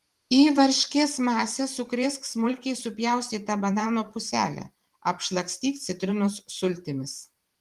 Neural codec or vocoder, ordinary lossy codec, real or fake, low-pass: vocoder, 44.1 kHz, 128 mel bands every 512 samples, BigVGAN v2; Opus, 24 kbps; fake; 14.4 kHz